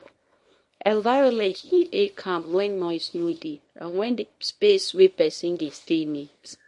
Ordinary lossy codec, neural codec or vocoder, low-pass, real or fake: MP3, 48 kbps; codec, 24 kHz, 0.9 kbps, WavTokenizer, small release; 10.8 kHz; fake